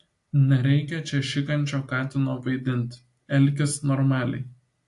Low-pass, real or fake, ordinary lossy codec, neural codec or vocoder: 10.8 kHz; real; AAC, 48 kbps; none